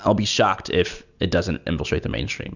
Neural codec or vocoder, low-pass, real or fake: none; 7.2 kHz; real